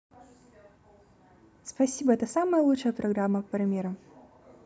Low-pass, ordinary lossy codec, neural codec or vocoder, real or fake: none; none; none; real